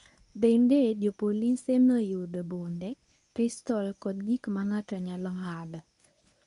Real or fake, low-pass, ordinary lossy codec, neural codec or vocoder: fake; 10.8 kHz; none; codec, 24 kHz, 0.9 kbps, WavTokenizer, medium speech release version 1